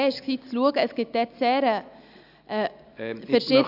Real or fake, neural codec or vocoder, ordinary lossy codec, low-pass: real; none; AAC, 48 kbps; 5.4 kHz